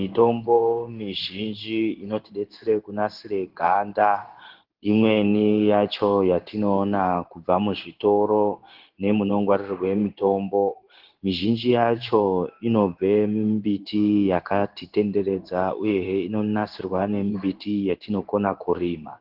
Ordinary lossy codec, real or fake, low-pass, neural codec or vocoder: Opus, 16 kbps; fake; 5.4 kHz; codec, 16 kHz in and 24 kHz out, 1 kbps, XY-Tokenizer